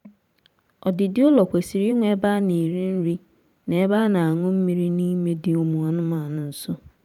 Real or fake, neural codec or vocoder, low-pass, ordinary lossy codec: fake; vocoder, 44.1 kHz, 128 mel bands every 512 samples, BigVGAN v2; 19.8 kHz; none